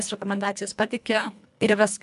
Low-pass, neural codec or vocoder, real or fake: 10.8 kHz; codec, 24 kHz, 1.5 kbps, HILCodec; fake